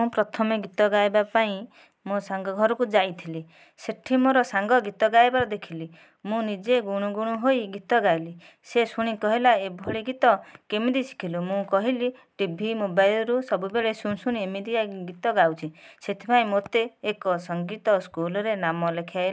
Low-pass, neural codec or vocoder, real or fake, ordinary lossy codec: none; none; real; none